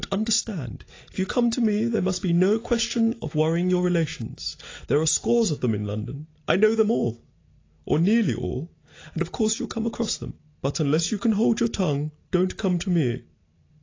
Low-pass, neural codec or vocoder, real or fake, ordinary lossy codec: 7.2 kHz; none; real; AAC, 32 kbps